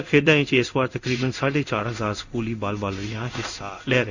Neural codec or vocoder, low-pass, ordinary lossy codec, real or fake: codec, 16 kHz in and 24 kHz out, 1 kbps, XY-Tokenizer; 7.2 kHz; none; fake